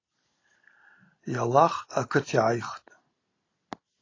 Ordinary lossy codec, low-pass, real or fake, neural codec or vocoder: AAC, 32 kbps; 7.2 kHz; real; none